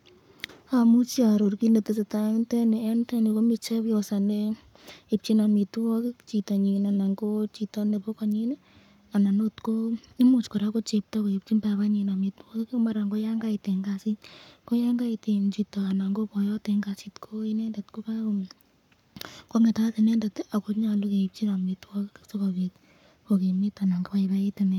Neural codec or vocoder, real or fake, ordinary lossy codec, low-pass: codec, 44.1 kHz, 7.8 kbps, Pupu-Codec; fake; none; 19.8 kHz